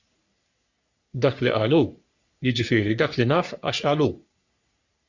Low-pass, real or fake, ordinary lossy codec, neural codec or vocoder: 7.2 kHz; fake; Opus, 64 kbps; codec, 44.1 kHz, 3.4 kbps, Pupu-Codec